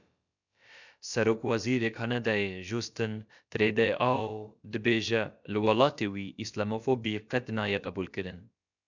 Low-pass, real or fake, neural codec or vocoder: 7.2 kHz; fake; codec, 16 kHz, about 1 kbps, DyCAST, with the encoder's durations